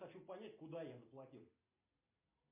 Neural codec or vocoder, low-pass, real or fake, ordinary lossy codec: none; 3.6 kHz; real; MP3, 32 kbps